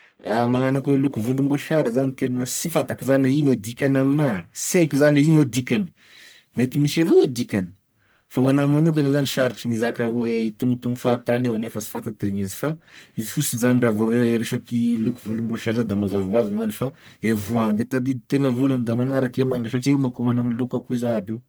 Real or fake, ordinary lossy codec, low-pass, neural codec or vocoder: fake; none; none; codec, 44.1 kHz, 1.7 kbps, Pupu-Codec